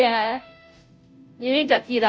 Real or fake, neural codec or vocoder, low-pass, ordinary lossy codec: fake; codec, 16 kHz, 0.5 kbps, FunCodec, trained on Chinese and English, 25 frames a second; none; none